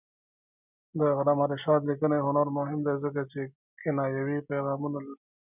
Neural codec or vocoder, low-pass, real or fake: none; 3.6 kHz; real